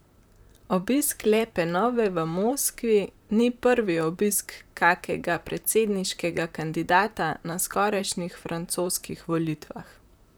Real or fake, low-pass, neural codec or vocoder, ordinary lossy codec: fake; none; vocoder, 44.1 kHz, 128 mel bands, Pupu-Vocoder; none